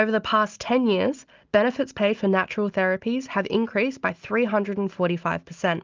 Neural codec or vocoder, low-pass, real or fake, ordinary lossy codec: none; 7.2 kHz; real; Opus, 32 kbps